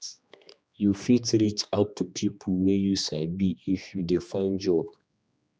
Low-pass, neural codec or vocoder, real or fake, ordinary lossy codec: none; codec, 16 kHz, 2 kbps, X-Codec, HuBERT features, trained on general audio; fake; none